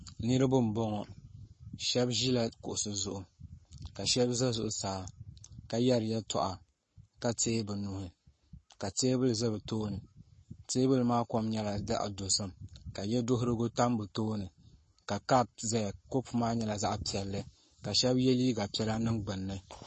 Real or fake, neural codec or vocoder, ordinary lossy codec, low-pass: fake; codec, 44.1 kHz, 7.8 kbps, Pupu-Codec; MP3, 32 kbps; 10.8 kHz